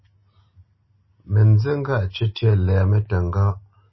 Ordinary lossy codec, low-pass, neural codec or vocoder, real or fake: MP3, 24 kbps; 7.2 kHz; none; real